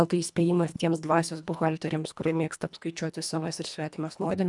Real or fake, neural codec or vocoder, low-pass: fake; codec, 24 kHz, 1.5 kbps, HILCodec; 10.8 kHz